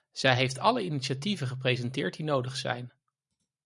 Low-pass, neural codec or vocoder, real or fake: 10.8 kHz; vocoder, 44.1 kHz, 128 mel bands every 512 samples, BigVGAN v2; fake